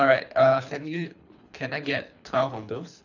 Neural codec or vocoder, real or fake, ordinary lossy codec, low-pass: codec, 24 kHz, 3 kbps, HILCodec; fake; none; 7.2 kHz